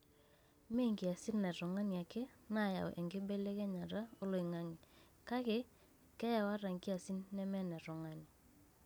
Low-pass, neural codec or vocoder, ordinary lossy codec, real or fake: none; none; none; real